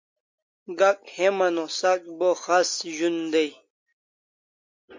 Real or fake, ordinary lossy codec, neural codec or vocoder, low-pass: real; MP3, 48 kbps; none; 7.2 kHz